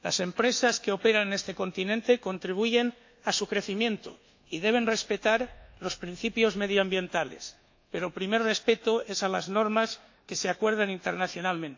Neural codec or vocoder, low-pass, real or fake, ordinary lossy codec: codec, 24 kHz, 1.2 kbps, DualCodec; 7.2 kHz; fake; AAC, 48 kbps